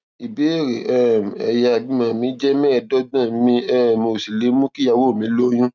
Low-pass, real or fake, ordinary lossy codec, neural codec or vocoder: none; real; none; none